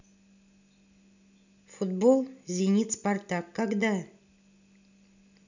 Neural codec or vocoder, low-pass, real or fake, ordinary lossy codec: none; 7.2 kHz; real; none